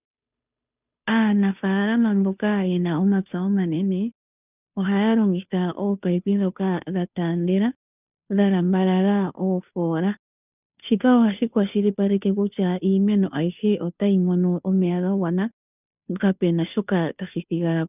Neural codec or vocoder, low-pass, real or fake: codec, 16 kHz, 2 kbps, FunCodec, trained on Chinese and English, 25 frames a second; 3.6 kHz; fake